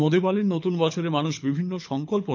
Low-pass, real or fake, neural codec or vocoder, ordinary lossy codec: 7.2 kHz; fake; codec, 24 kHz, 6 kbps, HILCodec; none